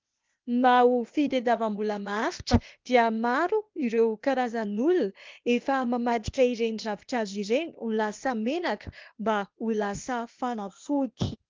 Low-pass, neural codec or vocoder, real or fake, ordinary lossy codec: 7.2 kHz; codec, 16 kHz, 0.8 kbps, ZipCodec; fake; Opus, 32 kbps